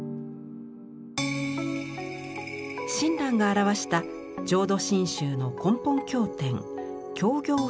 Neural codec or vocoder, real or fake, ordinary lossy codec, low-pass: none; real; none; none